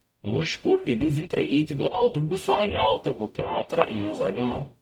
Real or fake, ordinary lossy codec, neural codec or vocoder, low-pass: fake; none; codec, 44.1 kHz, 0.9 kbps, DAC; 19.8 kHz